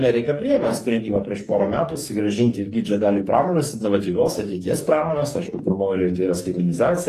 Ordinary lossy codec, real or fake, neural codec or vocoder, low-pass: AAC, 48 kbps; fake; codec, 44.1 kHz, 2.6 kbps, DAC; 14.4 kHz